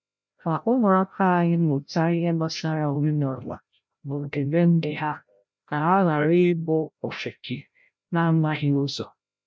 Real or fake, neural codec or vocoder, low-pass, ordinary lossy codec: fake; codec, 16 kHz, 0.5 kbps, FreqCodec, larger model; none; none